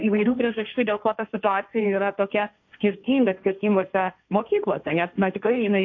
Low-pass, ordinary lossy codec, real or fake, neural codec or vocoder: 7.2 kHz; AAC, 48 kbps; fake; codec, 16 kHz, 1.1 kbps, Voila-Tokenizer